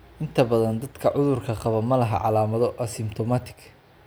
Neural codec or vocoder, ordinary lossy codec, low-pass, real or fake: none; none; none; real